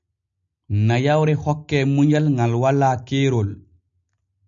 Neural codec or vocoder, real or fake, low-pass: none; real; 7.2 kHz